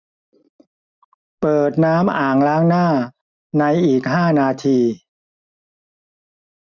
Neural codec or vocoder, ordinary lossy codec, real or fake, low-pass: none; none; real; 7.2 kHz